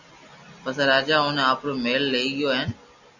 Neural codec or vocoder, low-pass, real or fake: none; 7.2 kHz; real